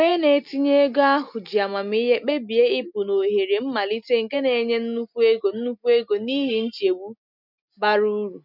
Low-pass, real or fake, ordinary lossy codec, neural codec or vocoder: 5.4 kHz; real; none; none